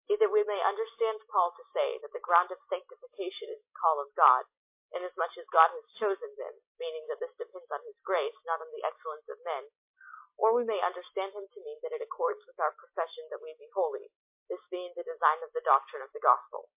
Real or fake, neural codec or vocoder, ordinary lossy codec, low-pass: real; none; MP3, 24 kbps; 3.6 kHz